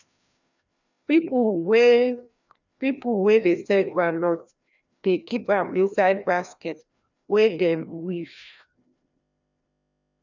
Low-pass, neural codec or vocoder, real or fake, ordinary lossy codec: 7.2 kHz; codec, 16 kHz, 1 kbps, FreqCodec, larger model; fake; none